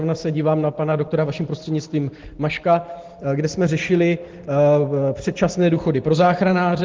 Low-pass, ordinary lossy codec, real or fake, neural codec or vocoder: 7.2 kHz; Opus, 16 kbps; real; none